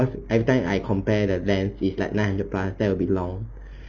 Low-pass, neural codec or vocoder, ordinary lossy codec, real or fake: 7.2 kHz; none; none; real